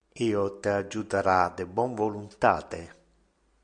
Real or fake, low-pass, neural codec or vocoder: real; 9.9 kHz; none